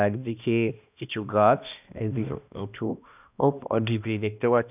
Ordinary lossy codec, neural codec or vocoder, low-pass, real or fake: none; codec, 16 kHz, 1 kbps, X-Codec, HuBERT features, trained on balanced general audio; 3.6 kHz; fake